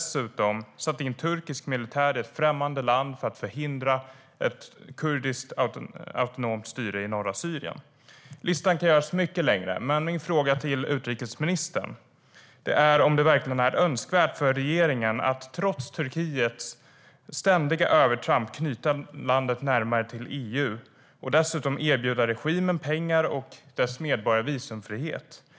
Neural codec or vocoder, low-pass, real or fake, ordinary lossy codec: none; none; real; none